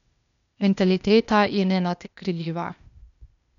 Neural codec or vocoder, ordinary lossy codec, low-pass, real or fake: codec, 16 kHz, 0.8 kbps, ZipCodec; none; 7.2 kHz; fake